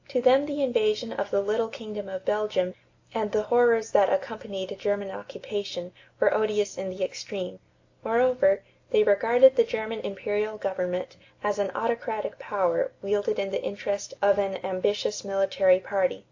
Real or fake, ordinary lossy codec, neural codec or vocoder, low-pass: real; AAC, 48 kbps; none; 7.2 kHz